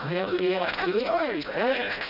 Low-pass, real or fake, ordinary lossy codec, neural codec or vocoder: 5.4 kHz; fake; none; codec, 16 kHz, 0.5 kbps, FreqCodec, smaller model